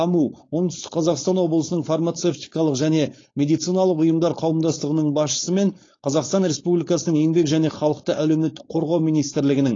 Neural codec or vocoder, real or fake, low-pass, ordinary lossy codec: codec, 16 kHz, 4.8 kbps, FACodec; fake; 7.2 kHz; AAC, 32 kbps